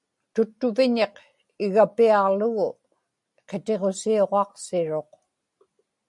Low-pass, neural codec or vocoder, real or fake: 10.8 kHz; none; real